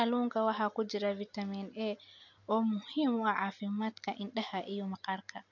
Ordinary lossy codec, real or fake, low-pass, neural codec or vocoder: none; real; 7.2 kHz; none